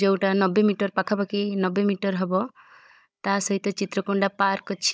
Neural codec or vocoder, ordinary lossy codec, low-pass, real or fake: codec, 16 kHz, 16 kbps, FunCodec, trained on Chinese and English, 50 frames a second; none; none; fake